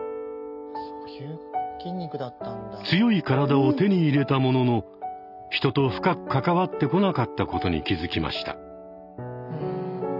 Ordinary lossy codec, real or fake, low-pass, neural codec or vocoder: none; real; 5.4 kHz; none